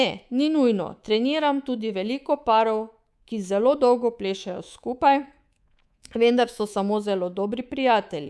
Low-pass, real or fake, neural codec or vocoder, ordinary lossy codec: none; fake; codec, 24 kHz, 3.1 kbps, DualCodec; none